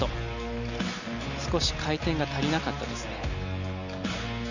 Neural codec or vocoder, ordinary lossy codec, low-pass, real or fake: none; none; 7.2 kHz; real